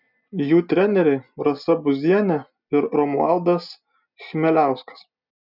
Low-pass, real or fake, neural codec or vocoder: 5.4 kHz; real; none